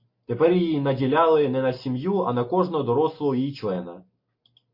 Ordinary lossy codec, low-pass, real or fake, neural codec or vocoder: MP3, 32 kbps; 5.4 kHz; real; none